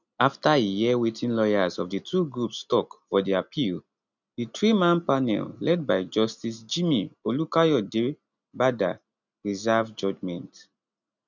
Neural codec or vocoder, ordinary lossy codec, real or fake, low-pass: none; none; real; 7.2 kHz